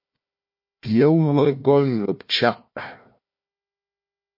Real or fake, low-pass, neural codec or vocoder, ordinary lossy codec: fake; 5.4 kHz; codec, 16 kHz, 1 kbps, FunCodec, trained on Chinese and English, 50 frames a second; MP3, 32 kbps